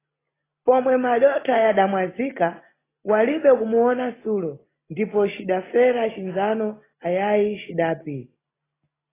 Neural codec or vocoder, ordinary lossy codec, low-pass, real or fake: none; AAC, 16 kbps; 3.6 kHz; real